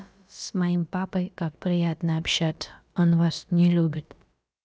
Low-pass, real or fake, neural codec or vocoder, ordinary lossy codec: none; fake; codec, 16 kHz, about 1 kbps, DyCAST, with the encoder's durations; none